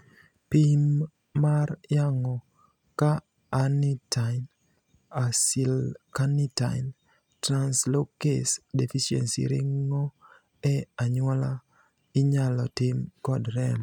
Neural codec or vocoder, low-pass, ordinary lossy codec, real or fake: none; 19.8 kHz; none; real